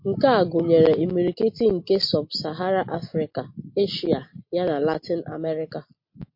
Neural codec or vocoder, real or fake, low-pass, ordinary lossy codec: none; real; 5.4 kHz; MP3, 32 kbps